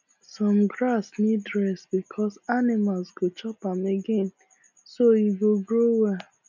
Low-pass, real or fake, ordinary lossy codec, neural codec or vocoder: 7.2 kHz; real; none; none